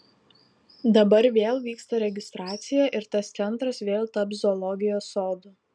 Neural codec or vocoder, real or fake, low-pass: none; real; 9.9 kHz